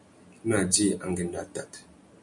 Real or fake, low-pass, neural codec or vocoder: real; 10.8 kHz; none